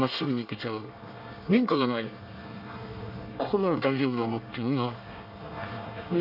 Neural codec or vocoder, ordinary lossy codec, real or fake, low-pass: codec, 24 kHz, 1 kbps, SNAC; none; fake; 5.4 kHz